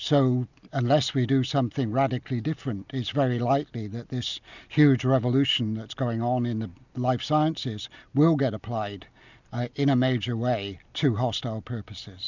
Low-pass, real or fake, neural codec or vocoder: 7.2 kHz; real; none